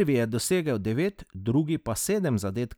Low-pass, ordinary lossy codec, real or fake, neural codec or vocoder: none; none; real; none